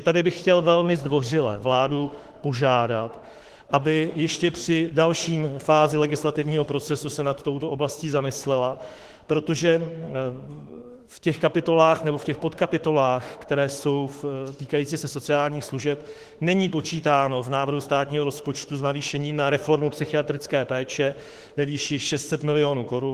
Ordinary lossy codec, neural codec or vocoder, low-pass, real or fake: Opus, 16 kbps; autoencoder, 48 kHz, 32 numbers a frame, DAC-VAE, trained on Japanese speech; 14.4 kHz; fake